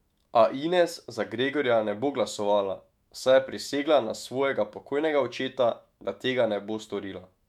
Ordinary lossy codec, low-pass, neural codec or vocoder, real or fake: MP3, 96 kbps; 19.8 kHz; autoencoder, 48 kHz, 128 numbers a frame, DAC-VAE, trained on Japanese speech; fake